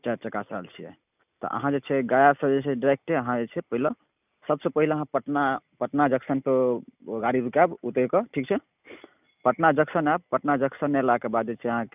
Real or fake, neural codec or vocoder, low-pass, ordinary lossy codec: real; none; 3.6 kHz; none